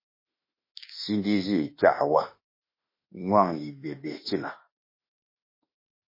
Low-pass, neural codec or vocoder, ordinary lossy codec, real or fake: 5.4 kHz; autoencoder, 48 kHz, 32 numbers a frame, DAC-VAE, trained on Japanese speech; MP3, 24 kbps; fake